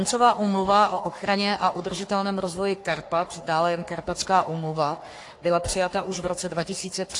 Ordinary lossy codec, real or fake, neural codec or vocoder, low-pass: AAC, 48 kbps; fake; codec, 44.1 kHz, 3.4 kbps, Pupu-Codec; 10.8 kHz